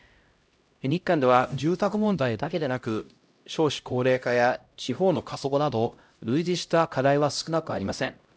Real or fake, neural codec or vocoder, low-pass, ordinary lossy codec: fake; codec, 16 kHz, 0.5 kbps, X-Codec, HuBERT features, trained on LibriSpeech; none; none